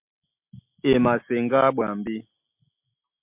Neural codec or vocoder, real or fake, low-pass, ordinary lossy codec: none; real; 3.6 kHz; MP3, 32 kbps